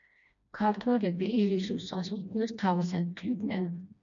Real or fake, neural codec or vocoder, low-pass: fake; codec, 16 kHz, 1 kbps, FreqCodec, smaller model; 7.2 kHz